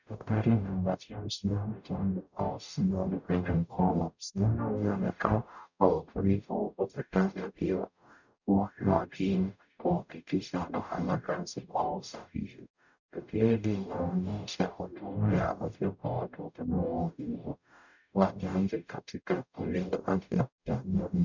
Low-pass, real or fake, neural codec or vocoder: 7.2 kHz; fake; codec, 44.1 kHz, 0.9 kbps, DAC